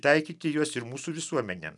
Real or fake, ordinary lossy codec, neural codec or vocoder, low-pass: real; MP3, 96 kbps; none; 10.8 kHz